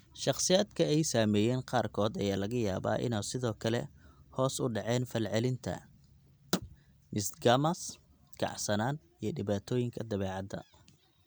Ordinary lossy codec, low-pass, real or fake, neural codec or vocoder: none; none; real; none